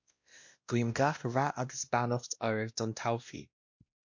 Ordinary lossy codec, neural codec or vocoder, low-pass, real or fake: MP3, 48 kbps; codec, 16 kHz, 1 kbps, X-Codec, WavLM features, trained on Multilingual LibriSpeech; 7.2 kHz; fake